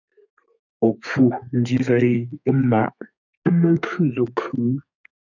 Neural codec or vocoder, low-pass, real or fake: codec, 32 kHz, 1.9 kbps, SNAC; 7.2 kHz; fake